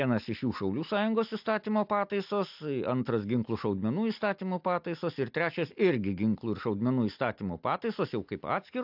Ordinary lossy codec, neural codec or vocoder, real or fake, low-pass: AAC, 48 kbps; none; real; 5.4 kHz